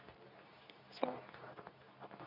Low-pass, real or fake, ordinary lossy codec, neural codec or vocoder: 5.4 kHz; fake; none; codec, 24 kHz, 0.9 kbps, WavTokenizer, medium speech release version 2